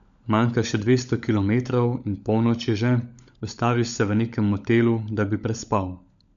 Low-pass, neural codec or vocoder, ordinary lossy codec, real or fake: 7.2 kHz; codec, 16 kHz, 16 kbps, FunCodec, trained on LibriTTS, 50 frames a second; none; fake